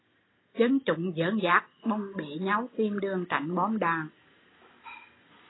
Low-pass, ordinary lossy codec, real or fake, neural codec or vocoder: 7.2 kHz; AAC, 16 kbps; real; none